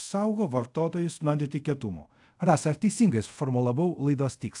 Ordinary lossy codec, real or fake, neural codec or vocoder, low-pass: AAC, 64 kbps; fake; codec, 24 kHz, 0.5 kbps, DualCodec; 10.8 kHz